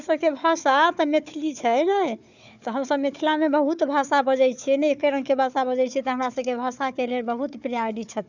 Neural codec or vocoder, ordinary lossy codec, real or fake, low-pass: codec, 16 kHz, 4 kbps, FunCodec, trained on Chinese and English, 50 frames a second; none; fake; 7.2 kHz